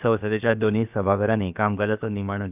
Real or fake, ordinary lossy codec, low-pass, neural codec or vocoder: fake; none; 3.6 kHz; codec, 16 kHz, about 1 kbps, DyCAST, with the encoder's durations